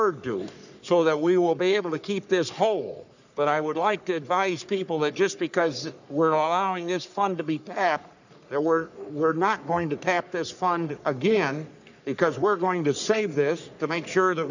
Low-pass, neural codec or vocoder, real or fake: 7.2 kHz; codec, 44.1 kHz, 3.4 kbps, Pupu-Codec; fake